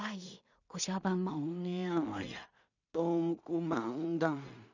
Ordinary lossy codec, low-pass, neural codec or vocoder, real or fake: none; 7.2 kHz; codec, 16 kHz in and 24 kHz out, 0.4 kbps, LongCat-Audio-Codec, two codebook decoder; fake